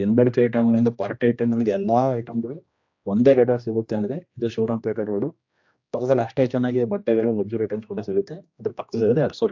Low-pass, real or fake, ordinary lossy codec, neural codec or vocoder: 7.2 kHz; fake; AAC, 48 kbps; codec, 16 kHz, 1 kbps, X-Codec, HuBERT features, trained on general audio